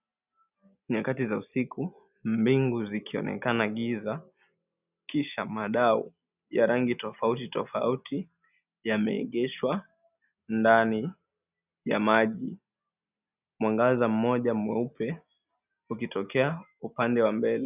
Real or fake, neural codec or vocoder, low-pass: real; none; 3.6 kHz